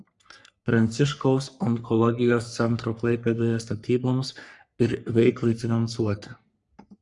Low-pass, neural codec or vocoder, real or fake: 10.8 kHz; codec, 44.1 kHz, 3.4 kbps, Pupu-Codec; fake